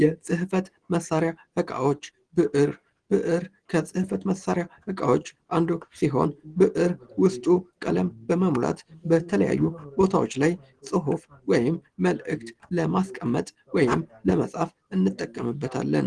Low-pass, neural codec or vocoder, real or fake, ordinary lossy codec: 9.9 kHz; none; real; Opus, 16 kbps